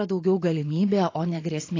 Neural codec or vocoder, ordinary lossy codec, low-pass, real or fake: vocoder, 44.1 kHz, 128 mel bands every 512 samples, BigVGAN v2; AAC, 32 kbps; 7.2 kHz; fake